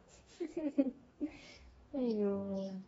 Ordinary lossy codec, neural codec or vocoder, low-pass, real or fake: AAC, 24 kbps; codec, 44.1 kHz, 2.6 kbps, DAC; 19.8 kHz; fake